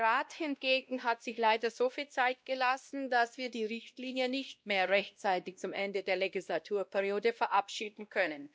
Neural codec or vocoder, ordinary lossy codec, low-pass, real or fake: codec, 16 kHz, 1 kbps, X-Codec, WavLM features, trained on Multilingual LibriSpeech; none; none; fake